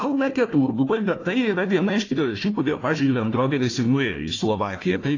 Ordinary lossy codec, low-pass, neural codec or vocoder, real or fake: AAC, 32 kbps; 7.2 kHz; codec, 16 kHz, 1 kbps, FunCodec, trained on Chinese and English, 50 frames a second; fake